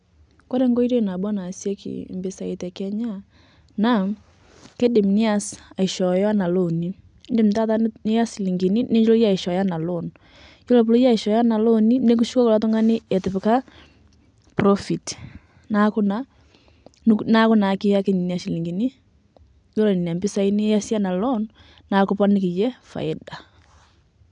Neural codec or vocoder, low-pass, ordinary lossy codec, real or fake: none; 9.9 kHz; none; real